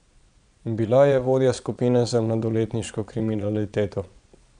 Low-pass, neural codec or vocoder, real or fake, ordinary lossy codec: 9.9 kHz; vocoder, 22.05 kHz, 80 mel bands, Vocos; fake; none